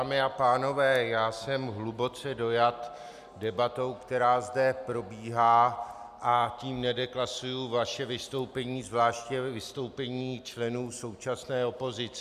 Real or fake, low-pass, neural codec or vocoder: real; 14.4 kHz; none